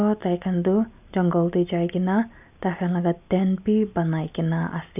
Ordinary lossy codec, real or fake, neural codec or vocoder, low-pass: none; real; none; 3.6 kHz